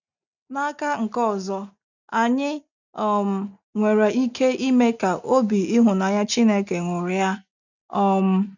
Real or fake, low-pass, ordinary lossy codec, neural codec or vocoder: real; 7.2 kHz; none; none